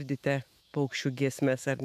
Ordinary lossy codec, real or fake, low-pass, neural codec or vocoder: AAC, 96 kbps; fake; 14.4 kHz; autoencoder, 48 kHz, 128 numbers a frame, DAC-VAE, trained on Japanese speech